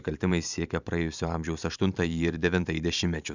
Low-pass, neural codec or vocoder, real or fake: 7.2 kHz; vocoder, 44.1 kHz, 128 mel bands every 512 samples, BigVGAN v2; fake